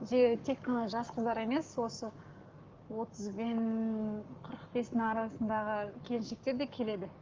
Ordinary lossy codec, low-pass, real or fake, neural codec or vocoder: Opus, 32 kbps; 7.2 kHz; fake; codec, 44.1 kHz, 7.8 kbps, Pupu-Codec